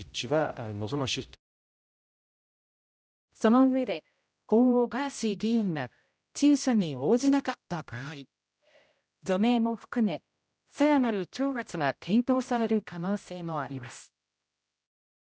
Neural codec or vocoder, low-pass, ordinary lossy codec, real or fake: codec, 16 kHz, 0.5 kbps, X-Codec, HuBERT features, trained on general audio; none; none; fake